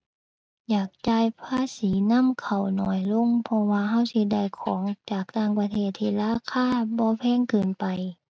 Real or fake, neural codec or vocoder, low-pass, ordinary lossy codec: real; none; none; none